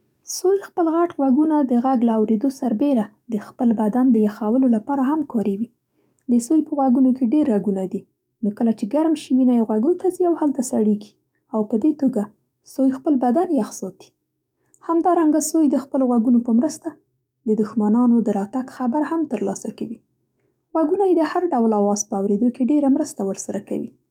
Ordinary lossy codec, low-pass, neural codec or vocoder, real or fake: none; 19.8 kHz; codec, 44.1 kHz, 7.8 kbps, DAC; fake